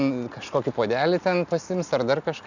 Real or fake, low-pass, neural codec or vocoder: real; 7.2 kHz; none